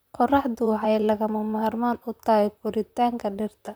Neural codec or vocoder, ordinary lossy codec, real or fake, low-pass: vocoder, 44.1 kHz, 128 mel bands, Pupu-Vocoder; none; fake; none